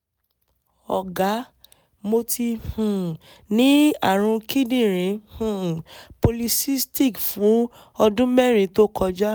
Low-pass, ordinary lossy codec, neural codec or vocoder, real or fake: none; none; none; real